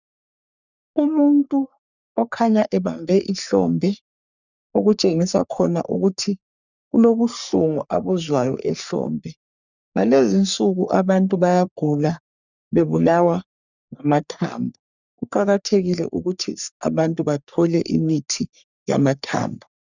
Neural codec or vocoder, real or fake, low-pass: codec, 44.1 kHz, 3.4 kbps, Pupu-Codec; fake; 7.2 kHz